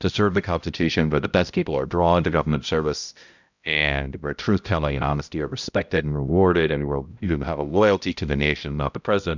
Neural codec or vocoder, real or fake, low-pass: codec, 16 kHz, 0.5 kbps, X-Codec, HuBERT features, trained on balanced general audio; fake; 7.2 kHz